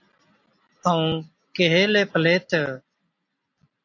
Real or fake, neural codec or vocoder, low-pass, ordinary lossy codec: real; none; 7.2 kHz; AAC, 48 kbps